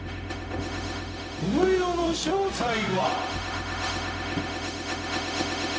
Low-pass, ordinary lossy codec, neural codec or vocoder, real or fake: none; none; codec, 16 kHz, 0.4 kbps, LongCat-Audio-Codec; fake